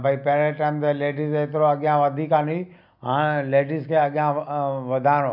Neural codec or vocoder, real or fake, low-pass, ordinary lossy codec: none; real; 5.4 kHz; none